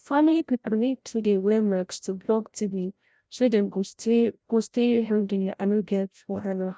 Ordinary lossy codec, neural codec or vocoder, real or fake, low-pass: none; codec, 16 kHz, 0.5 kbps, FreqCodec, larger model; fake; none